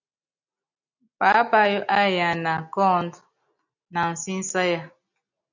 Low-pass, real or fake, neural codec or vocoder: 7.2 kHz; real; none